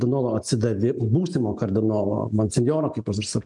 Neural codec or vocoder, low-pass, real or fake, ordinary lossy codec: none; 10.8 kHz; real; MP3, 64 kbps